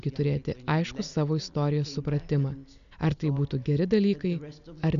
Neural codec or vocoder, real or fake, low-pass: none; real; 7.2 kHz